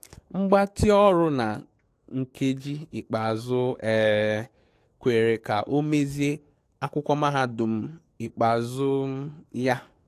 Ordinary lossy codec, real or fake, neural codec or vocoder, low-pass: AAC, 64 kbps; fake; codec, 44.1 kHz, 7.8 kbps, DAC; 14.4 kHz